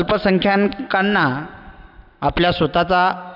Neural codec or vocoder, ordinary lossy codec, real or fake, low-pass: none; none; real; 5.4 kHz